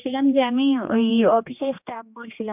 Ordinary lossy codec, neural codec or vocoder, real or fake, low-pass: AAC, 32 kbps; codec, 16 kHz, 2 kbps, X-Codec, HuBERT features, trained on general audio; fake; 3.6 kHz